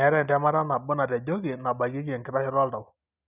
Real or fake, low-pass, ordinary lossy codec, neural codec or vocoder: real; 3.6 kHz; none; none